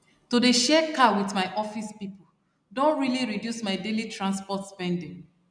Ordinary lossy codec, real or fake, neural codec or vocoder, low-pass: none; real; none; 9.9 kHz